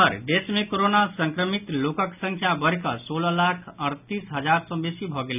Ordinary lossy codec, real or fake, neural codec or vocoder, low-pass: none; real; none; 3.6 kHz